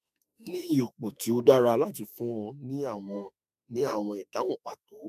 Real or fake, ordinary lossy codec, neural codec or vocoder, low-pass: fake; none; autoencoder, 48 kHz, 32 numbers a frame, DAC-VAE, trained on Japanese speech; 14.4 kHz